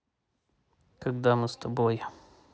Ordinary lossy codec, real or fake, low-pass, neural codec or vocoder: none; real; none; none